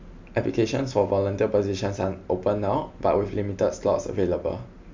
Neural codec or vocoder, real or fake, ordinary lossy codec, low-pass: none; real; MP3, 64 kbps; 7.2 kHz